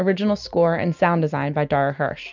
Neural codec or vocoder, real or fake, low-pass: none; real; 7.2 kHz